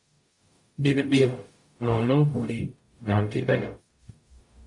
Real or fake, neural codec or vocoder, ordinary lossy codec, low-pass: fake; codec, 44.1 kHz, 0.9 kbps, DAC; MP3, 48 kbps; 10.8 kHz